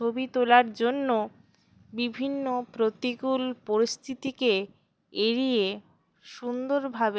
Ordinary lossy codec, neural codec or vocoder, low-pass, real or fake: none; none; none; real